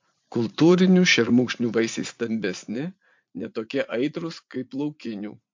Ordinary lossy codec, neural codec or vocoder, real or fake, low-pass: MP3, 48 kbps; vocoder, 44.1 kHz, 80 mel bands, Vocos; fake; 7.2 kHz